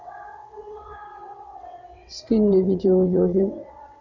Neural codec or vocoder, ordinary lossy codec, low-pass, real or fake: vocoder, 22.05 kHz, 80 mel bands, WaveNeXt; none; 7.2 kHz; fake